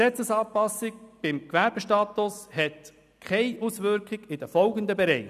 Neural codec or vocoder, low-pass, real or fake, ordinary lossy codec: none; 14.4 kHz; real; none